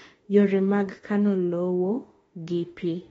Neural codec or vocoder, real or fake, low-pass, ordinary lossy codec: autoencoder, 48 kHz, 32 numbers a frame, DAC-VAE, trained on Japanese speech; fake; 19.8 kHz; AAC, 24 kbps